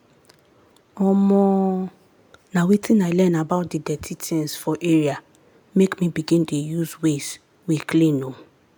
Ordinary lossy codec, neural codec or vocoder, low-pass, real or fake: none; none; none; real